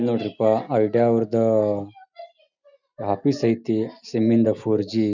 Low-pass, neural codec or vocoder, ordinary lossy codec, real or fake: 7.2 kHz; none; none; real